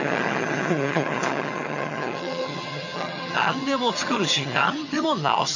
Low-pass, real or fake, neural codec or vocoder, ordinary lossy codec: 7.2 kHz; fake; vocoder, 22.05 kHz, 80 mel bands, HiFi-GAN; none